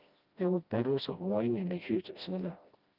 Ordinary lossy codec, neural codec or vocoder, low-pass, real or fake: Opus, 24 kbps; codec, 16 kHz, 1 kbps, FreqCodec, smaller model; 5.4 kHz; fake